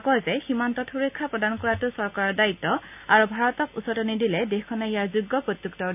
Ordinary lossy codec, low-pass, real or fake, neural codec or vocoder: none; 3.6 kHz; real; none